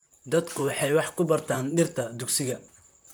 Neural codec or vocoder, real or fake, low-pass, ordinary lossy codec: vocoder, 44.1 kHz, 128 mel bands, Pupu-Vocoder; fake; none; none